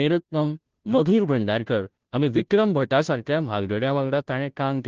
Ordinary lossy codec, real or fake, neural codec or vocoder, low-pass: Opus, 16 kbps; fake; codec, 16 kHz, 0.5 kbps, FunCodec, trained on Chinese and English, 25 frames a second; 7.2 kHz